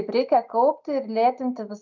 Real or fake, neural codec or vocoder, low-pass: real; none; 7.2 kHz